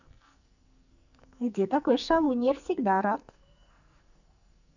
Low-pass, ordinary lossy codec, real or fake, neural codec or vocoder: 7.2 kHz; none; fake; codec, 44.1 kHz, 2.6 kbps, SNAC